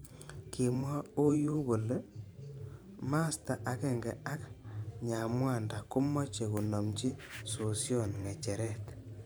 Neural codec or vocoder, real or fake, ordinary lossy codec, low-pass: vocoder, 44.1 kHz, 128 mel bands every 512 samples, BigVGAN v2; fake; none; none